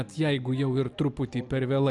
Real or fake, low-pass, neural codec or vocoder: real; 10.8 kHz; none